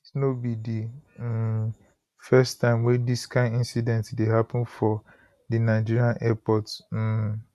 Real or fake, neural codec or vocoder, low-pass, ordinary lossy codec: real; none; 14.4 kHz; none